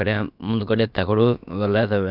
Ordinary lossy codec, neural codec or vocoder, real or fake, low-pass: none; codec, 16 kHz, about 1 kbps, DyCAST, with the encoder's durations; fake; 5.4 kHz